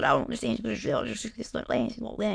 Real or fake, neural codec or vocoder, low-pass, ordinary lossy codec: fake; autoencoder, 22.05 kHz, a latent of 192 numbers a frame, VITS, trained on many speakers; 9.9 kHz; AAC, 64 kbps